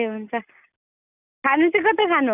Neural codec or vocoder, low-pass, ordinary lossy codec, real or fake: none; 3.6 kHz; none; real